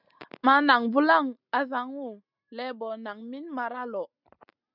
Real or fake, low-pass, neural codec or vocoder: real; 5.4 kHz; none